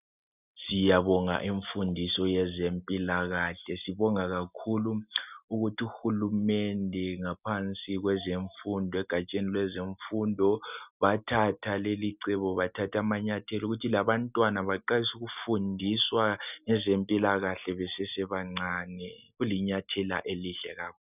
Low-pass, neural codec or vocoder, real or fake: 3.6 kHz; none; real